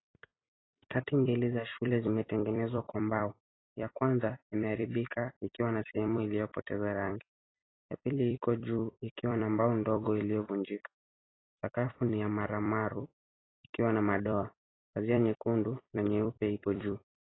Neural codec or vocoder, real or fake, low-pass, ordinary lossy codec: vocoder, 44.1 kHz, 128 mel bands every 256 samples, BigVGAN v2; fake; 7.2 kHz; AAC, 16 kbps